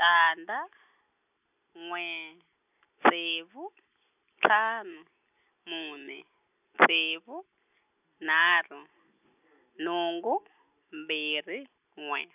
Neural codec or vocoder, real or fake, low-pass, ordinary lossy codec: none; real; 3.6 kHz; none